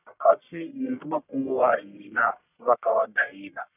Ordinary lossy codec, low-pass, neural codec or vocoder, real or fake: none; 3.6 kHz; codec, 44.1 kHz, 1.7 kbps, Pupu-Codec; fake